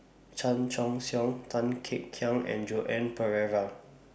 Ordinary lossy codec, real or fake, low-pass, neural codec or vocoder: none; real; none; none